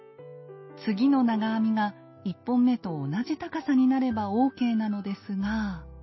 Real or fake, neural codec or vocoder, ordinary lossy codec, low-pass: real; none; MP3, 24 kbps; 7.2 kHz